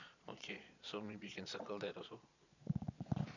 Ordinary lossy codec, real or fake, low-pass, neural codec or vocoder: none; fake; 7.2 kHz; vocoder, 22.05 kHz, 80 mel bands, Vocos